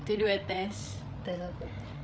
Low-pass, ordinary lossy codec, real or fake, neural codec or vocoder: none; none; fake; codec, 16 kHz, 8 kbps, FreqCodec, larger model